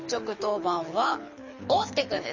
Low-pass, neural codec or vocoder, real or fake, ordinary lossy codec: 7.2 kHz; codec, 24 kHz, 6 kbps, HILCodec; fake; MP3, 32 kbps